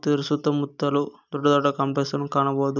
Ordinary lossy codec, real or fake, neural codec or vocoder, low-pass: none; real; none; 7.2 kHz